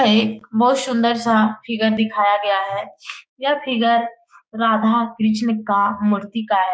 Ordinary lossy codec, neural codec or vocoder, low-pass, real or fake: none; codec, 16 kHz, 6 kbps, DAC; none; fake